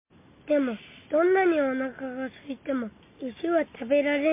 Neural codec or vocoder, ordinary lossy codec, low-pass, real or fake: none; MP3, 24 kbps; 3.6 kHz; real